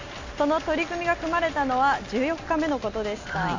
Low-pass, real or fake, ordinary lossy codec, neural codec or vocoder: 7.2 kHz; real; MP3, 64 kbps; none